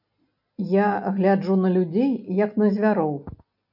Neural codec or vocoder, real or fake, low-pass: none; real; 5.4 kHz